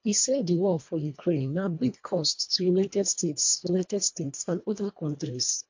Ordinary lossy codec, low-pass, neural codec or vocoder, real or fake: MP3, 48 kbps; 7.2 kHz; codec, 24 kHz, 1.5 kbps, HILCodec; fake